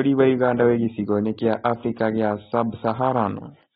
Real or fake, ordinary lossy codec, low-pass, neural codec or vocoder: real; AAC, 16 kbps; 7.2 kHz; none